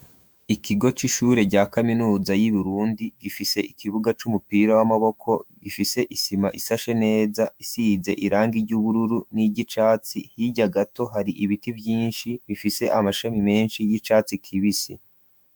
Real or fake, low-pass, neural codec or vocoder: fake; 19.8 kHz; autoencoder, 48 kHz, 128 numbers a frame, DAC-VAE, trained on Japanese speech